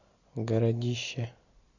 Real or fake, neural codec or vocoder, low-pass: real; none; 7.2 kHz